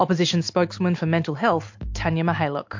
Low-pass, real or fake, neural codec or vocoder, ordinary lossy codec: 7.2 kHz; real; none; MP3, 48 kbps